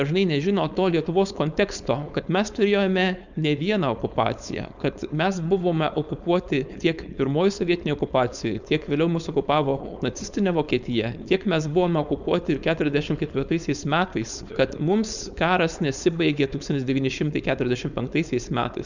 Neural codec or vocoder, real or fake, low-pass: codec, 16 kHz, 4.8 kbps, FACodec; fake; 7.2 kHz